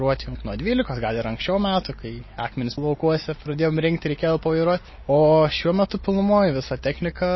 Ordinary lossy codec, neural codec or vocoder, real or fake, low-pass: MP3, 24 kbps; none; real; 7.2 kHz